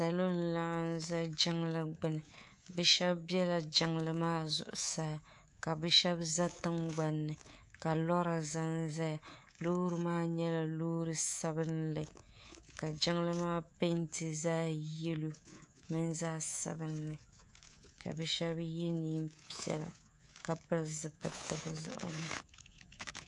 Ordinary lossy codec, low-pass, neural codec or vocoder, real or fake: AAC, 64 kbps; 10.8 kHz; codec, 24 kHz, 3.1 kbps, DualCodec; fake